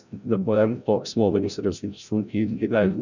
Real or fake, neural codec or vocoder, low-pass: fake; codec, 16 kHz, 0.5 kbps, FreqCodec, larger model; 7.2 kHz